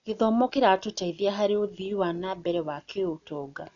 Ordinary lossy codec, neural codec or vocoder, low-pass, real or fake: none; none; 7.2 kHz; real